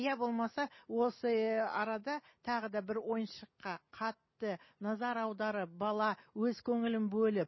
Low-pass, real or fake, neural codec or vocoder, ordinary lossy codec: 7.2 kHz; real; none; MP3, 24 kbps